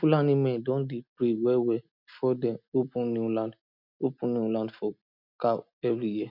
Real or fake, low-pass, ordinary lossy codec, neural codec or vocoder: real; 5.4 kHz; none; none